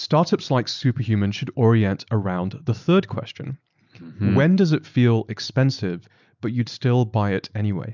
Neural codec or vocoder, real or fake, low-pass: none; real; 7.2 kHz